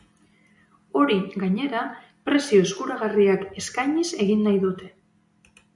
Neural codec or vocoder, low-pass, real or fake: none; 10.8 kHz; real